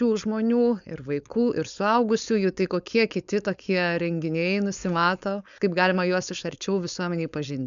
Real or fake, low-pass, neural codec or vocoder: fake; 7.2 kHz; codec, 16 kHz, 4.8 kbps, FACodec